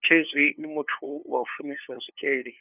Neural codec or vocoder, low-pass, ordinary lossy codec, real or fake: codec, 16 kHz, 2 kbps, FunCodec, trained on LibriTTS, 25 frames a second; 3.6 kHz; none; fake